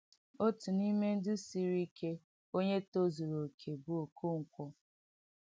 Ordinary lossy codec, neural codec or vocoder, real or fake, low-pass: none; none; real; none